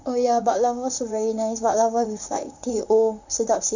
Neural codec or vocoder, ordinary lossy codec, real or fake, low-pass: vocoder, 44.1 kHz, 128 mel bands, Pupu-Vocoder; none; fake; 7.2 kHz